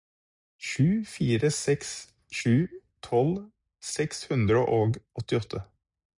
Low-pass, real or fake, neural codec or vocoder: 10.8 kHz; real; none